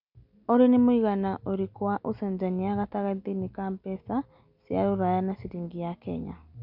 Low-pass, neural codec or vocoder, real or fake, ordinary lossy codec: 5.4 kHz; none; real; none